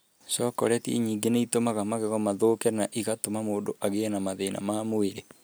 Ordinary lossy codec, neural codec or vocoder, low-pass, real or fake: none; none; none; real